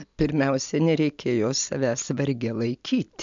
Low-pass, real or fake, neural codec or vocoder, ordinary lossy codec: 7.2 kHz; real; none; MP3, 64 kbps